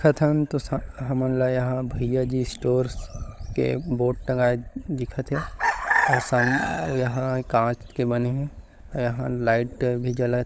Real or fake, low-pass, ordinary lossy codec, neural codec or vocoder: fake; none; none; codec, 16 kHz, 16 kbps, FunCodec, trained on LibriTTS, 50 frames a second